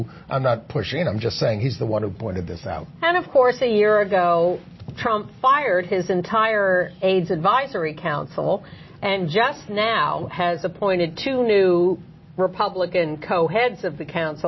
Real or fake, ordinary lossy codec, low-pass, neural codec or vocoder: real; MP3, 24 kbps; 7.2 kHz; none